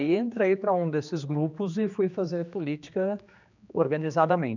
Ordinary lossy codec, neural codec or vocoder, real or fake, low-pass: none; codec, 16 kHz, 2 kbps, X-Codec, HuBERT features, trained on general audio; fake; 7.2 kHz